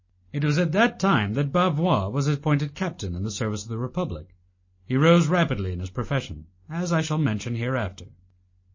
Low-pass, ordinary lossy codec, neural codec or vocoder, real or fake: 7.2 kHz; MP3, 32 kbps; none; real